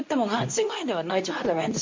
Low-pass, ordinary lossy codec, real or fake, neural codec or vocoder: 7.2 kHz; MP3, 48 kbps; fake; codec, 24 kHz, 0.9 kbps, WavTokenizer, medium speech release version 2